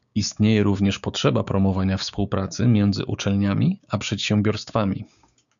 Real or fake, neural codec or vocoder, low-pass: fake; codec, 16 kHz, 6 kbps, DAC; 7.2 kHz